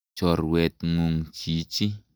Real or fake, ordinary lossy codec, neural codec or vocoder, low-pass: real; none; none; none